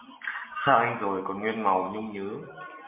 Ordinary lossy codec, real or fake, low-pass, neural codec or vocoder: MP3, 24 kbps; real; 3.6 kHz; none